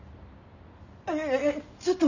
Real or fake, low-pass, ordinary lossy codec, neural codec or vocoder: real; 7.2 kHz; none; none